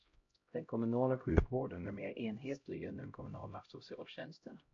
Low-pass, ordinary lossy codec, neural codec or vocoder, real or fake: 7.2 kHz; MP3, 48 kbps; codec, 16 kHz, 0.5 kbps, X-Codec, HuBERT features, trained on LibriSpeech; fake